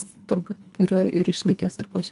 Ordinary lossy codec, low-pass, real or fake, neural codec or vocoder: MP3, 96 kbps; 10.8 kHz; fake; codec, 24 kHz, 1.5 kbps, HILCodec